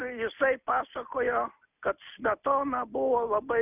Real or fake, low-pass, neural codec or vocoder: real; 3.6 kHz; none